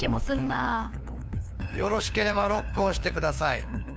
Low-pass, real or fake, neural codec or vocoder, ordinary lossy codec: none; fake; codec, 16 kHz, 2 kbps, FunCodec, trained on LibriTTS, 25 frames a second; none